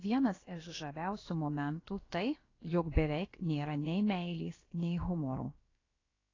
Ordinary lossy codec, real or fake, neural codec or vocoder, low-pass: AAC, 32 kbps; fake; codec, 16 kHz, about 1 kbps, DyCAST, with the encoder's durations; 7.2 kHz